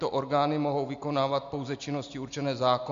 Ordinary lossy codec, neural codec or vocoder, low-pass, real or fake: MP3, 64 kbps; none; 7.2 kHz; real